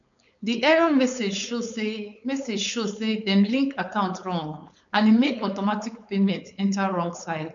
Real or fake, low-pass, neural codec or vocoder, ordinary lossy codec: fake; 7.2 kHz; codec, 16 kHz, 4.8 kbps, FACodec; none